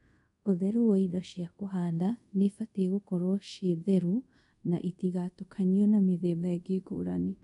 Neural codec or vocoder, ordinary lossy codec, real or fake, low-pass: codec, 24 kHz, 0.5 kbps, DualCodec; none; fake; 10.8 kHz